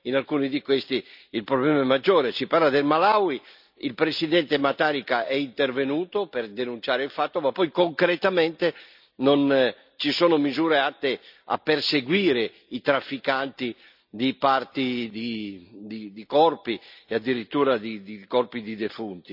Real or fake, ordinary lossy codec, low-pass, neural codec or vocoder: real; none; 5.4 kHz; none